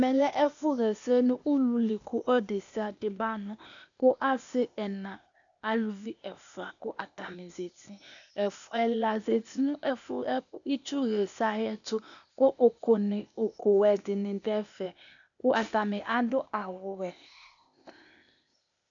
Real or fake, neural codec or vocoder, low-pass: fake; codec, 16 kHz, 0.8 kbps, ZipCodec; 7.2 kHz